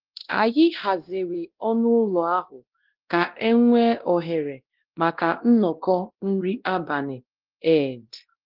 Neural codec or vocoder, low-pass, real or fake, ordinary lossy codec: codec, 16 kHz, 1 kbps, X-Codec, WavLM features, trained on Multilingual LibriSpeech; 5.4 kHz; fake; Opus, 16 kbps